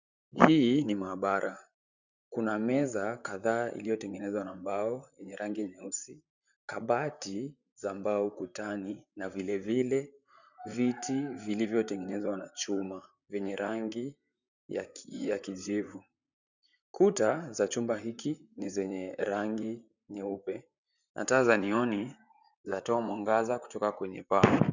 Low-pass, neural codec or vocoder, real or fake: 7.2 kHz; vocoder, 44.1 kHz, 128 mel bands, Pupu-Vocoder; fake